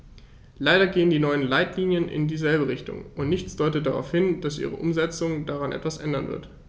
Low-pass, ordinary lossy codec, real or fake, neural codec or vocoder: none; none; real; none